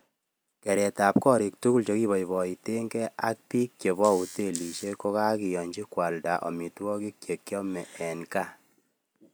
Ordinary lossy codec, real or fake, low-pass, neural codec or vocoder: none; real; none; none